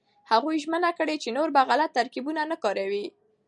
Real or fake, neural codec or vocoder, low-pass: real; none; 10.8 kHz